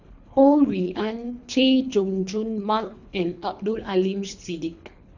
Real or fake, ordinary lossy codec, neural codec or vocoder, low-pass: fake; none; codec, 24 kHz, 3 kbps, HILCodec; 7.2 kHz